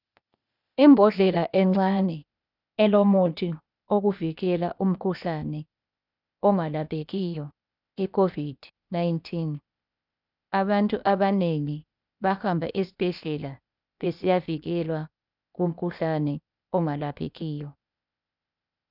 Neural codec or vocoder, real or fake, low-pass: codec, 16 kHz, 0.8 kbps, ZipCodec; fake; 5.4 kHz